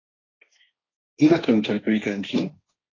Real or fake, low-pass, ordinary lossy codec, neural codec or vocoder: fake; 7.2 kHz; AAC, 32 kbps; codec, 16 kHz, 1.1 kbps, Voila-Tokenizer